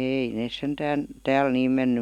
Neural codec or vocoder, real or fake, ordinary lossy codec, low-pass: none; real; none; 19.8 kHz